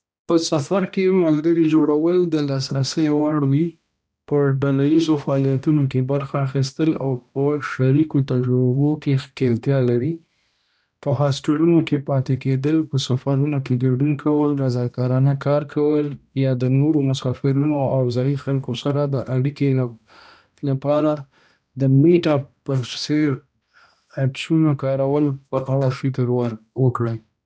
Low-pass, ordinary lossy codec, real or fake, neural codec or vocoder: none; none; fake; codec, 16 kHz, 1 kbps, X-Codec, HuBERT features, trained on balanced general audio